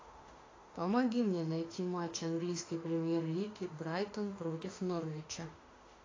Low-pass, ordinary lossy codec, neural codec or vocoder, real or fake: 7.2 kHz; AAC, 32 kbps; autoencoder, 48 kHz, 32 numbers a frame, DAC-VAE, trained on Japanese speech; fake